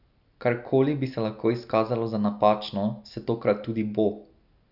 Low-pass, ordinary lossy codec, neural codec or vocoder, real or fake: 5.4 kHz; none; none; real